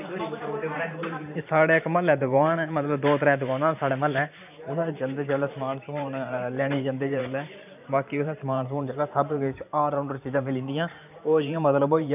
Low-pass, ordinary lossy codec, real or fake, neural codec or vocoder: 3.6 kHz; none; fake; vocoder, 44.1 kHz, 128 mel bands every 512 samples, BigVGAN v2